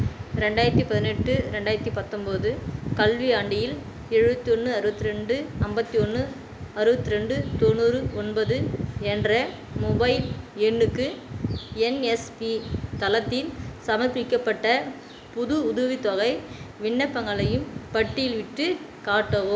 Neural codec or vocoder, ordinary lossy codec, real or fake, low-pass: none; none; real; none